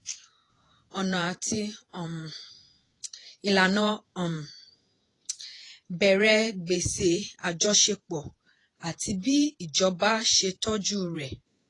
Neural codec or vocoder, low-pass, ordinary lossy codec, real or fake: vocoder, 48 kHz, 128 mel bands, Vocos; 10.8 kHz; AAC, 32 kbps; fake